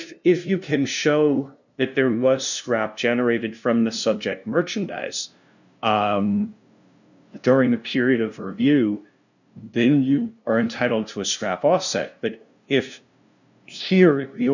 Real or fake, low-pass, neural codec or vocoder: fake; 7.2 kHz; codec, 16 kHz, 0.5 kbps, FunCodec, trained on LibriTTS, 25 frames a second